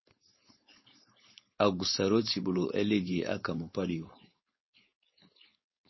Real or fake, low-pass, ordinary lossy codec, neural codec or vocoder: fake; 7.2 kHz; MP3, 24 kbps; codec, 16 kHz, 4.8 kbps, FACodec